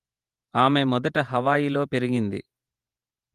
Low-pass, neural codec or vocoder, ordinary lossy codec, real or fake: 14.4 kHz; vocoder, 48 kHz, 128 mel bands, Vocos; Opus, 32 kbps; fake